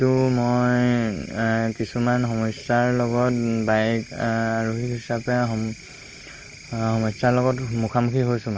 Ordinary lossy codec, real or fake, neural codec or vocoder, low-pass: Opus, 24 kbps; real; none; 7.2 kHz